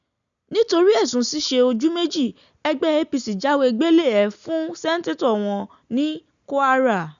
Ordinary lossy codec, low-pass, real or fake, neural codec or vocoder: none; 7.2 kHz; real; none